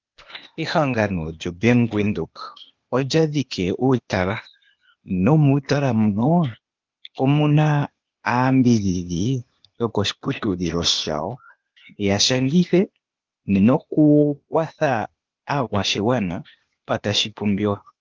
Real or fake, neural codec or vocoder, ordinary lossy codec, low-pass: fake; codec, 16 kHz, 0.8 kbps, ZipCodec; Opus, 24 kbps; 7.2 kHz